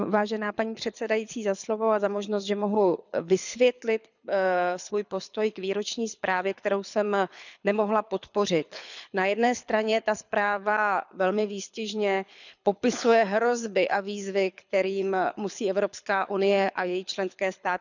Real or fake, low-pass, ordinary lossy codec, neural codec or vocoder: fake; 7.2 kHz; none; codec, 24 kHz, 6 kbps, HILCodec